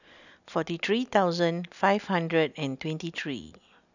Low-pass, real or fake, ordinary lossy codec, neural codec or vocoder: 7.2 kHz; real; none; none